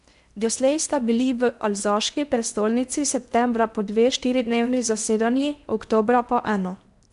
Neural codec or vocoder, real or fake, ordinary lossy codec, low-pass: codec, 16 kHz in and 24 kHz out, 0.8 kbps, FocalCodec, streaming, 65536 codes; fake; none; 10.8 kHz